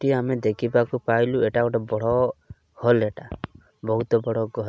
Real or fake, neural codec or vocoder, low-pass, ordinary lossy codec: real; none; none; none